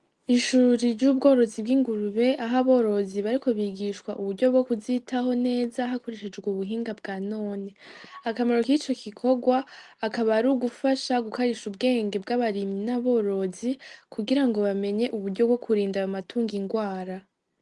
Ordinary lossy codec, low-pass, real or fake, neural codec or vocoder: Opus, 32 kbps; 10.8 kHz; real; none